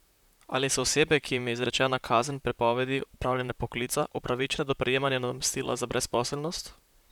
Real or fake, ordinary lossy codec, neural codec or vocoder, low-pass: fake; none; vocoder, 44.1 kHz, 128 mel bands, Pupu-Vocoder; 19.8 kHz